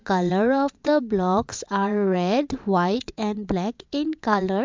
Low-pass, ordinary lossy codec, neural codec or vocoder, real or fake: 7.2 kHz; MP3, 64 kbps; vocoder, 22.05 kHz, 80 mel bands, WaveNeXt; fake